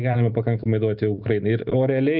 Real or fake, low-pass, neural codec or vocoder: real; 5.4 kHz; none